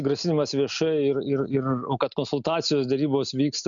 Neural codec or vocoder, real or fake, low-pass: none; real; 7.2 kHz